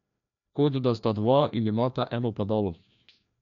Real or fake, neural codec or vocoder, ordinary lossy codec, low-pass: fake; codec, 16 kHz, 1 kbps, FreqCodec, larger model; none; 7.2 kHz